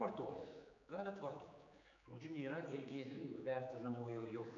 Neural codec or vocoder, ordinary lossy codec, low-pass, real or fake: codec, 16 kHz, 4 kbps, X-Codec, HuBERT features, trained on general audio; MP3, 64 kbps; 7.2 kHz; fake